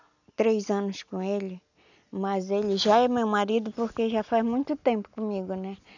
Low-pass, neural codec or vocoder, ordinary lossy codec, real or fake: 7.2 kHz; none; none; real